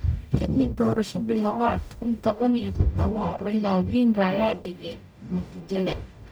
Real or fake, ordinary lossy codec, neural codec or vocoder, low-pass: fake; none; codec, 44.1 kHz, 0.9 kbps, DAC; none